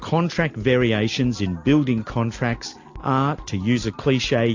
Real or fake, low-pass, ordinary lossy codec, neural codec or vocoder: real; 7.2 kHz; AAC, 48 kbps; none